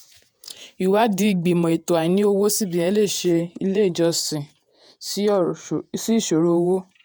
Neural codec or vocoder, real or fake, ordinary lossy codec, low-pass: vocoder, 48 kHz, 128 mel bands, Vocos; fake; none; none